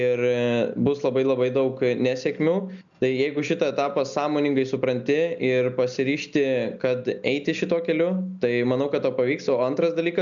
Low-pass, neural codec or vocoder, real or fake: 7.2 kHz; none; real